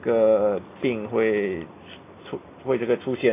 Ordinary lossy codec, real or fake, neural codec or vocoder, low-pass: AAC, 24 kbps; real; none; 3.6 kHz